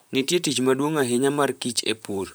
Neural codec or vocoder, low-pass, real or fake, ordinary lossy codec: vocoder, 44.1 kHz, 128 mel bands, Pupu-Vocoder; none; fake; none